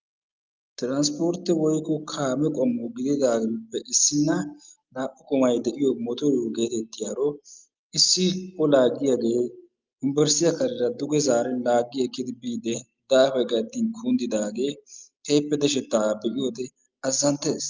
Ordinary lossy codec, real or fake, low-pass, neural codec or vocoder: Opus, 24 kbps; real; 7.2 kHz; none